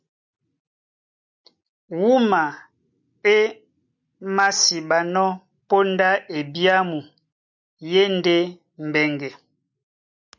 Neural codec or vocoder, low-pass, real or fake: none; 7.2 kHz; real